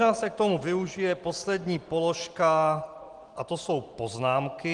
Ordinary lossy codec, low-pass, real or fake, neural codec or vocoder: Opus, 24 kbps; 10.8 kHz; real; none